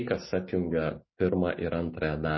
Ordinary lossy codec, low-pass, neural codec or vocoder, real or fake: MP3, 24 kbps; 7.2 kHz; none; real